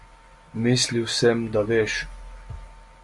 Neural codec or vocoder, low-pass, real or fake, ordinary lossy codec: none; 10.8 kHz; real; MP3, 96 kbps